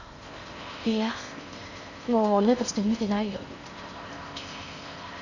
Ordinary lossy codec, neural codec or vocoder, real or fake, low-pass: none; codec, 16 kHz in and 24 kHz out, 0.6 kbps, FocalCodec, streaming, 4096 codes; fake; 7.2 kHz